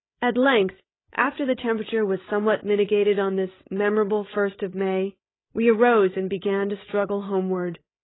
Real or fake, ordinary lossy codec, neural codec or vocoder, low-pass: fake; AAC, 16 kbps; codec, 16 kHz, 16 kbps, FreqCodec, larger model; 7.2 kHz